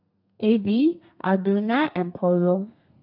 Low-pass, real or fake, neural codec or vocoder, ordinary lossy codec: 5.4 kHz; fake; codec, 32 kHz, 1.9 kbps, SNAC; AAC, 48 kbps